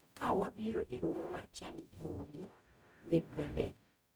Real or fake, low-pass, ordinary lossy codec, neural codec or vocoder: fake; none; none; codec, 44.1 kHz, 0.9 kbps, DAC